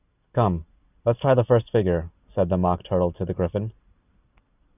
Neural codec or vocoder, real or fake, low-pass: none; real; 3.6 kHz